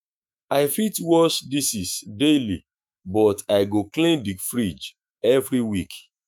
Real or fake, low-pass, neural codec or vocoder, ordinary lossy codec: fake; none; autoencoder, 48 kHz, 128 numbers a frame, DAC-VAE, trained on Japanese speech; none